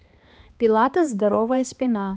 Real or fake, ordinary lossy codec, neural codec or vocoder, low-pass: fake; none; codec, 16 kHz, 2 kbps, X-Codec, HuBERT features, trained on balanced general audio; none